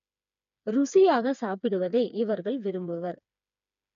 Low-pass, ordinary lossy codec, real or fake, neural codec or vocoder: 7.2 kHz; none; fake; codec, 16 kHz, 4 kbps, FreqCodec, smaller model